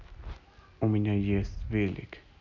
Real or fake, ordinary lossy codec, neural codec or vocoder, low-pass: real; none; none; 7.2 kHz